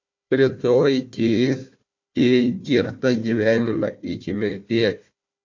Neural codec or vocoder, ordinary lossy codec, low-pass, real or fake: codec, 16 kHz, 1 kbps, FunCodec, trained on Chinese and English, 50 frames a second; MP3, 48 kbps; 7.2 kHz; fake